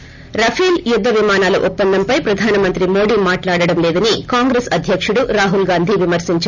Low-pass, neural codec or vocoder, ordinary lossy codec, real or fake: 7.2 kHz; none; none; real